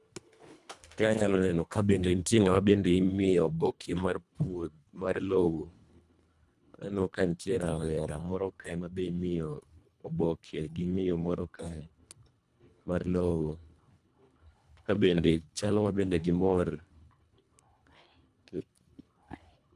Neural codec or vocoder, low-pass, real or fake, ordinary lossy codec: codec, 24 kHz, 1.5 kbps, HILCodec; none; fake; none